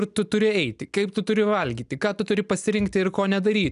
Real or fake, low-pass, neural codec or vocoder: real; 10.8 kHz; none